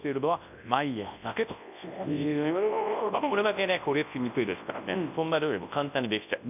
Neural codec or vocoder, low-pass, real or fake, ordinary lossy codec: codec, 24 kHz, 0.9 kbps, WavTokenizer, large speech release; 3.6 kHz; fake; none